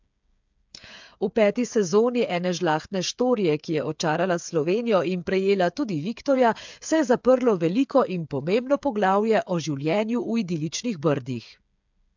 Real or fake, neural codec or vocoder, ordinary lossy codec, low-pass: fake; codec, 16 kHz, 8 kbps, FreqCodec, smaller model; MP3, 64 kbps; 7.2 kHz